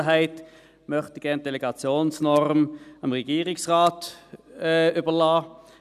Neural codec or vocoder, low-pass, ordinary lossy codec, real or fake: none; 14.4 kHz; none; real